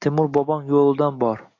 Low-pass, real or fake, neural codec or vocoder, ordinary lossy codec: 7.2 kHz; real; none; AAC, 32 kbps